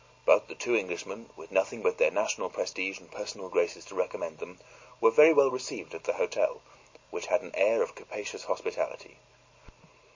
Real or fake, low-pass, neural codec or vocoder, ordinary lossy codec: real; 7.2 kHz; none; MP3, 32 kbps